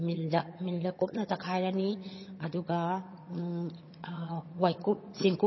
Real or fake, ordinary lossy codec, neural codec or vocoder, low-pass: fake; MP3, 24 kbps; vocoder, 22.05 kHz, 80 mel bands, HiFi-GAN; 7.2 kHz